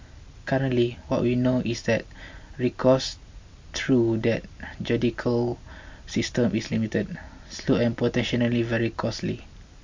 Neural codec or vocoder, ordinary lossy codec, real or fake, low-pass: none; MP3, 48 kbps; real; 7.2 kHz